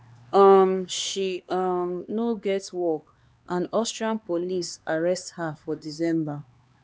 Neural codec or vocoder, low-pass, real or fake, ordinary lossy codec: codec, 16 kHz, 2 kbps, X-Codec, HuBERT features, trained on LibriSpeech; none; fake; none